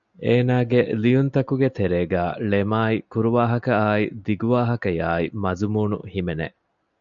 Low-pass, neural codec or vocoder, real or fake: 7.2 kHz; none; real